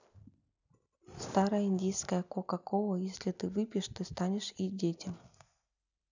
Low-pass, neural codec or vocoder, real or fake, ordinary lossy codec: 7.2 kHz; none; real; none